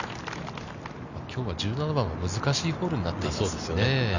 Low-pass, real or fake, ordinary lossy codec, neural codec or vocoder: 7.2 kHz; real; none; none